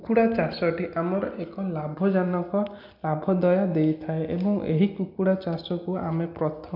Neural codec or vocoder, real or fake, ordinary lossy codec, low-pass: none; real; none; 5.4 kHz